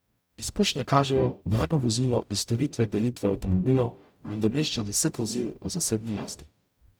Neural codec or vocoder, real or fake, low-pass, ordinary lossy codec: codec, 44.1 kHz, 0.9 kbps, DAC; fake; none; none